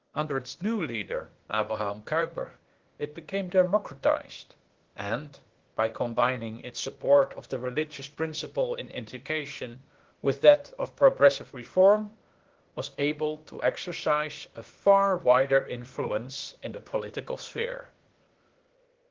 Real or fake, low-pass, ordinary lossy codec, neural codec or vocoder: fake; 7.2 kHz; Opus, 16 kbps; codec, 16 kHz, 0.8 kbps, ZipCodec